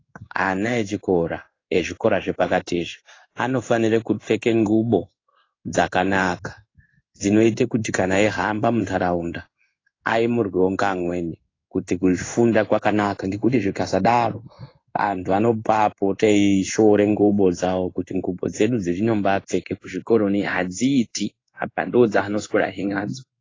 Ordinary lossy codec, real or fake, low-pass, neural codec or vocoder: AAC, 32 kbps; fake; 7.2 kHz; codec, 16 kHz in and 24 kHz out, 1 kbps, XY-Tokenizer